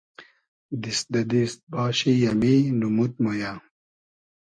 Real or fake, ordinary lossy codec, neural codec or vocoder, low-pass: real; MP3, 48 kbps; none; 9.9 kHz